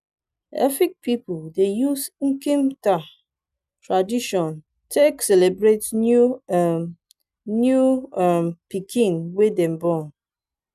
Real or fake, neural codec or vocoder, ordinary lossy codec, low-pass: real; none; none; 14.4 kHz